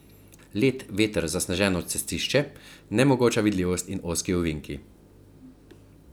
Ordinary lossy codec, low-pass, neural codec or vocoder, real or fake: none; none; none; real